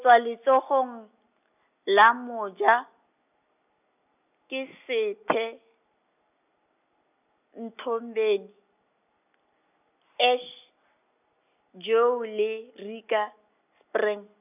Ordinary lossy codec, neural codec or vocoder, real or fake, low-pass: none; none; real; 3.6 kHz